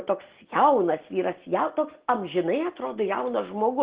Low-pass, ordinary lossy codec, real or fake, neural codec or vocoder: 3.6 kHz; Opus, 16 kbps; real; none